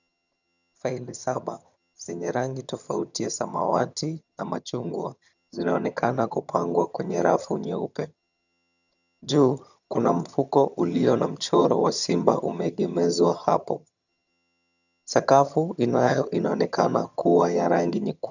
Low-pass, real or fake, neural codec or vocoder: 7.2 kHz; fake; vocoder, 22.05 kHz, 80 mel bands, HiFi-GAN